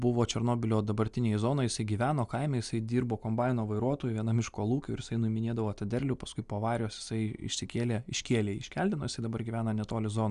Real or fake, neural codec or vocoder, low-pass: real; none; 10.8 kHz